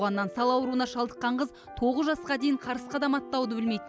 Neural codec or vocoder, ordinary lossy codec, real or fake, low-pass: none; none; real; none